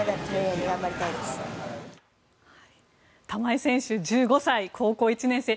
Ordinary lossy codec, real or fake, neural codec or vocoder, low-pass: none; real; none; none